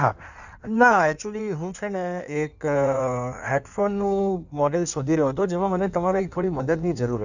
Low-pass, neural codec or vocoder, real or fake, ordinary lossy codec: 7.2 kHz; codec, 16 kHz in and 24 kHz out, 1.1 kbps, FireRedTTS-2 codec; fake; none